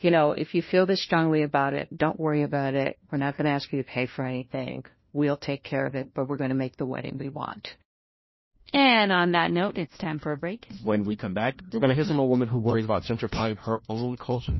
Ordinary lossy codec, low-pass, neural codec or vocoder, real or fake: MP3, 24 kbps; 7.2 kHz; codec, 16 kHz, 1 kbps, FunCodec, trained on LibriTTS, 50 frames a second; fake